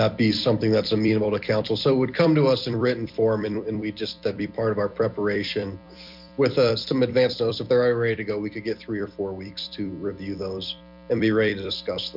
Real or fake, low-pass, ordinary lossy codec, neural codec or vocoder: fake; 5.4 kHz; MP3, 48 kbps; vocoder, 44.1 kHz, 128 mel bands every 256 samples, BigVGAN v2